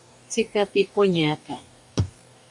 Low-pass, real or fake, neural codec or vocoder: 10.8 kHz; fake; codec, 44.1 kHz, 2.6 kbps, DAC